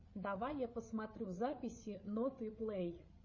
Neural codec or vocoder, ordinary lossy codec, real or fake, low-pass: codec, 16 kHz, 8 kbps, FreqCodec, larger model; MP3, 32 kbps; fake; 7.2 kHz